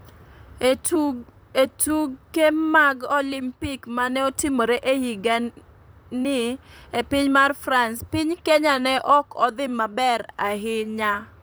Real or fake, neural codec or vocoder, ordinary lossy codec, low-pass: fake; vocoder, 44.1 kHz, 128 mel bands, Pupu-Vocoder; none; none